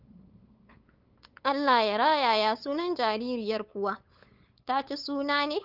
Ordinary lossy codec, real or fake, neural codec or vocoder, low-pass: Opus, 24 kbps; fake; codec, 16 kHz, 8 kbps, FunCodec, trained on LibriTTS, 25 frames a second; 5.4 kHz